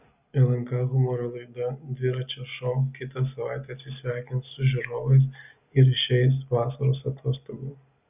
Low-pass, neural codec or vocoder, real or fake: 3.6 kHz; none; real